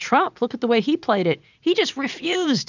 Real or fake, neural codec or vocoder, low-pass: fake; vocoder, 44.1 kHz, 80 mel bands, Vocos; 7.2 kHz